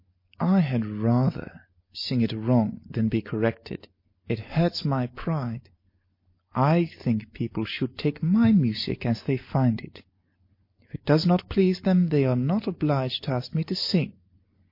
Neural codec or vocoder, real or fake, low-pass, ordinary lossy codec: none; real; 5.4 kHz; MP3, 32 kbps